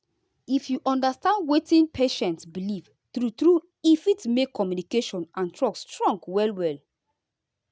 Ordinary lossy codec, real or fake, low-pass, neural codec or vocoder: none; real; none; none